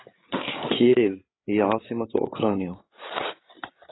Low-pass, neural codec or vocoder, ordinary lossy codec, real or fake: 7.2 kHz; codec, 16 kHz, 8 kbps, FreqCodec, larger model; AAC, 16 kbps; fake